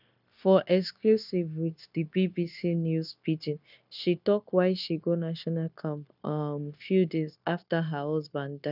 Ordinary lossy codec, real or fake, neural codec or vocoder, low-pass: none; fake; codec, 16 kHz, 0.9 kbps, LongCat-Audio-Codec; 5.4 kHz